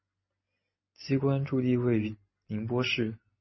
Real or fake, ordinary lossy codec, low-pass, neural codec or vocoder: real; MP3, 24 kbps; 7.2 kHz; none